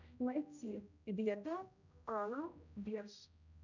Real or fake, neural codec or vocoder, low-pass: fake; codec, 16 kHz, 0.5 kbps, X-Codec, HuBERT features, trained on general audio; 7.2 kHz